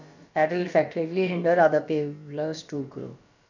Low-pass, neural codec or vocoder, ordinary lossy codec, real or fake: 7.2 kHz; codec, 16 kHz, about 1 kbps, DyCAST, with the encoder's durations; none; fake